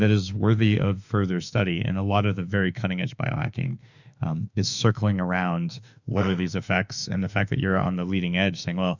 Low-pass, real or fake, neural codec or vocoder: 7.2 kHz; fake; autoencoder, 48 kHz, 32 numbers a frame, DAC-VAE, trained on Japanese speech